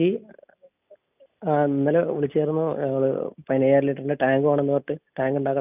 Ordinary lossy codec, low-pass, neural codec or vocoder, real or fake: none; 3.6 kHz; none; real